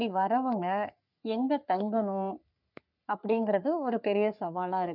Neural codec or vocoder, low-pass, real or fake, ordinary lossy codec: codec, 44.1 kHz, 3.4 kbps, Pupu-Codec; 5.4 kHz; fake; none